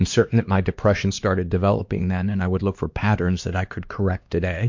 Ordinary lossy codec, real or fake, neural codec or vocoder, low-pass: MP3, 48 kbps; fake; codec, 16 kHz, 2 kbps, X-Codec, HuBERT features, trained on LibriSpeech; 7.2 kHz